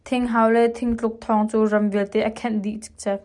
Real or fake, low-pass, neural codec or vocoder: real; 10.8 kHz; none